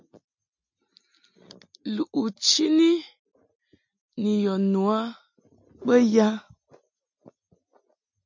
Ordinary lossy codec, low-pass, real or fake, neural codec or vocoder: MP3, 64 kbps; 7.2 kHz; real; none